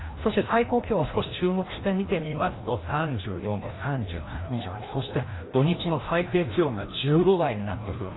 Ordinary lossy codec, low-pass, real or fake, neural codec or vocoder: AAC, 16 kbps; 7.2 kHz; fake; codec, 16 kHz, 1 kbps, FreqCodec, larger model